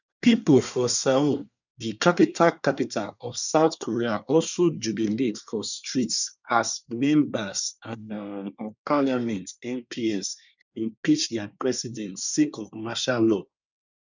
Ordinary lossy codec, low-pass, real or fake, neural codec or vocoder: none; 7.2 kHz; fake; codec, 24 kHz, 1 kbps, SNAC